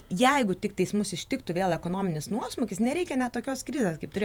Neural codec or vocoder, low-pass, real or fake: vocoder, 48 kHz, 128 mel bands, Vocos; 19.8 kHz; fake